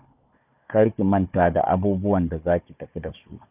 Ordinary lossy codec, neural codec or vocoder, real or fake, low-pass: none; codec, 16 kHz, 4 kbps, FunCodec, trained on Chinese and English, 50 frames a second; fake; 3.6 kHz